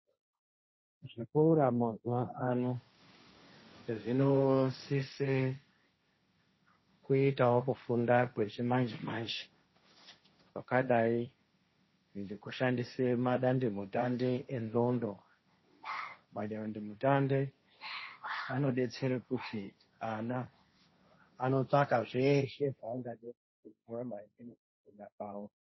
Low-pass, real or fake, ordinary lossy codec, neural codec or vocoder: 7.2 kHz; fake; MP3, 24 kbps; codec, 16 kHz, 1.1 kbps, Voila-Tokenizer